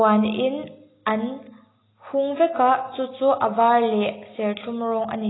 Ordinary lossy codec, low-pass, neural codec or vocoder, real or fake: AAC, 16 kbps; 7.2 kHz; none; real